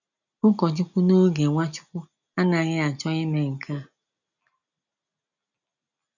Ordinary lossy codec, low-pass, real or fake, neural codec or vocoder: none; 7.2 kHz; real; none